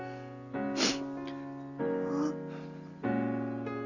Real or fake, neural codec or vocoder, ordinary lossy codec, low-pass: real; none; none; 7.2 kHz